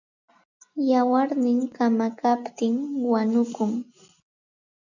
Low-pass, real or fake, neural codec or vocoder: 7.2 kHz; real; none